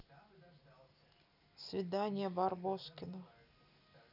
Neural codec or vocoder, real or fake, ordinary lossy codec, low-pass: none; real; none; 5.4 kHz